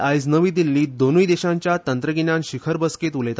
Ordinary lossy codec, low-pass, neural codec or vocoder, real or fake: none; none; none; real